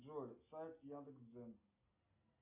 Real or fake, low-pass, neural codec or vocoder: real; 3.6 kHz; none